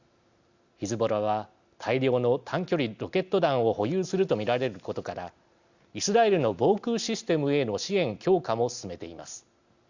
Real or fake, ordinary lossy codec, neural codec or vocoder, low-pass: real; Opus, 64 kbps; none; 7.2 kHz